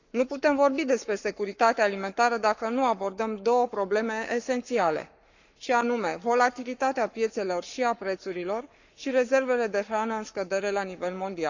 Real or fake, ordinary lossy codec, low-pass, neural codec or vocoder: fake; none; 7.2 kHz; codec, 44.1 kHz, 7.8 kbps, Pupu-Codec